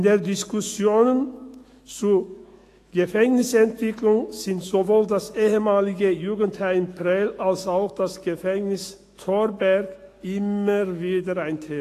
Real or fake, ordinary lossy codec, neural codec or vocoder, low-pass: fake; AAC, 48 kbps; autoencoder, 48 kHz, 128 numbers a frame, DAC-VAE, trained on Japanese speech; 14.4 kHz